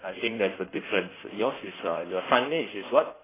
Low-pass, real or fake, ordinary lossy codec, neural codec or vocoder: 3.6 kHz; fake; AAC, 16 kbps; codec, 16 kHz in and 24 kHz out, 1.1 kbps, FireRedTTS-2 codec